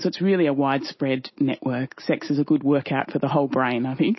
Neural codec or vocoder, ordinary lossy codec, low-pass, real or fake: none; MP3, 24 kbps; 7.2 kHz; real